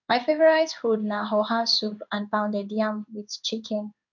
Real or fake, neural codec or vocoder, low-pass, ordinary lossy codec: fake; codec, 16 kHz in and 24 kHz out, 1 kbps, XY-Tokenizer; 7.2 kHz; none